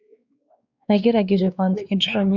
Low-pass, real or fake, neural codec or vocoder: 7.2 kHz; fake; codec, 16 kHz, 2 kbps, X-Codec, WavLM features, trained on Multilingual LibriSpeech